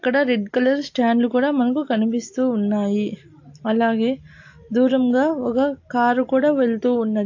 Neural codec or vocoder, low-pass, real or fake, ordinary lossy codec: none; 7.2 kHz; real; AAC, 48 kbps